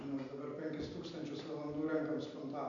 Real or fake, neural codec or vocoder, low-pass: real; none; 7.2 kHz